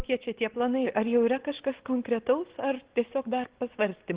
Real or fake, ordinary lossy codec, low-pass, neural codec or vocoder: real; Opus, 16 kbps; 3.6 kHz; none